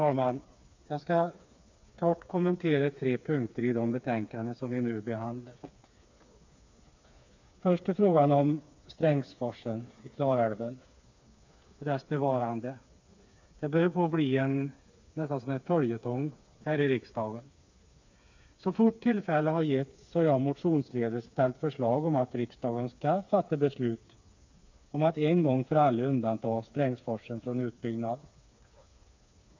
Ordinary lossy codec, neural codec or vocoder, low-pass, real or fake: AAC, 48 kbps; codec, 16 kHz, 4 kbps, FreqCodec, smaller model; 7.2 kHz; fake